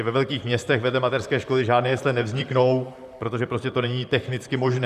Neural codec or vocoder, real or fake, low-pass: vocoder, 44.1 kHz, 128 mel bands, Pupu-Vocoder; fake; 14.4 kHz